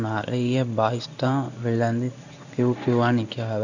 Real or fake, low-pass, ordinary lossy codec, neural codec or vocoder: fake; 7.2 kHz; none; codec, 16 kHz in and 24 kHz out, 1 kbps, XY-Tokenizer